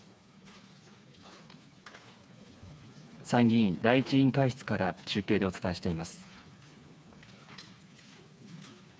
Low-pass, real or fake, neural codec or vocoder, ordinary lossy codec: none; fake; codec, 16 kHz, 4 kbps, FreqCodec, smaller model; none